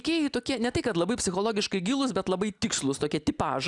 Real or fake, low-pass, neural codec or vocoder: real; 10.8 kHz; none